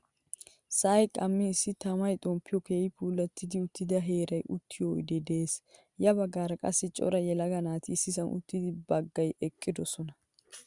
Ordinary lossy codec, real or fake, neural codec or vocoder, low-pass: Opus, 64 kbps; real; none; 10.8 kHz